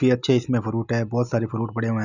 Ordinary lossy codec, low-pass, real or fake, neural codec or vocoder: none; 7.2 kHz; real; none